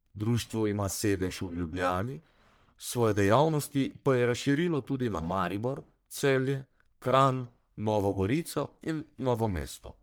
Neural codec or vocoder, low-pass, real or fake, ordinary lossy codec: codec, 44.1 kHz, 1.7 kbps, Pupu-Codec; none; fake; none